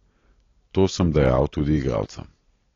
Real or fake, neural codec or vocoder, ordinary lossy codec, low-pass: real; none; AAC, 32 kbps; 7.2 kHz